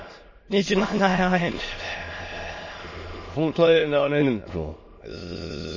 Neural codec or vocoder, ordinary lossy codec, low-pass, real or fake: autoencoder, 22.05 kHz, a latent of 192 numbers a frame, VITS, trained on many speakers; MP3, 32 kbps; 7.2 kHz; fake